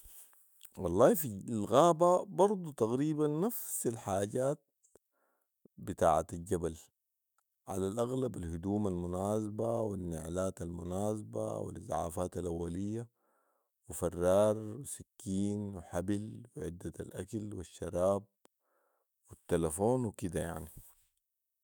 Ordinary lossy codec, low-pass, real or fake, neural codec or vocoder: none; none; fake; autoencoder, 48 kHz, 128 numbers a frame, DAC-VAE, trained on Japanese speech